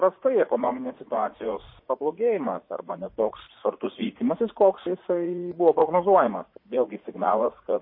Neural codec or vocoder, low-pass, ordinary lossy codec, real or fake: vocoder, 44.1 kHz, 80 mel bands, Vocos; 5.4 kHz; MP3, 24 kbps; fake